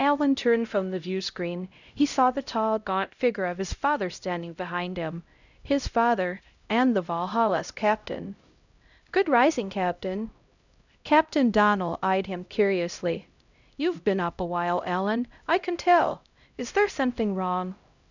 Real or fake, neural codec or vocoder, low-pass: fake; codec, 16 kHz, 0.5 kbps, X-Codec, HuBERT features, trained on LibriSpeech; 7.2 kHz